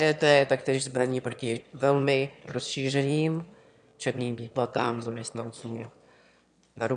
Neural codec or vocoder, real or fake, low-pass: autoencoder, 22.05 kHz, a latent of 192 numbers a frame, VITS, trained on one speaker; fake; 9.9 kHz